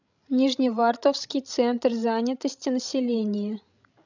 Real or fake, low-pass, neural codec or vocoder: fake; 7.2 kHz; codec, 16 kHz, 16 kbps, FreqCodec, larger model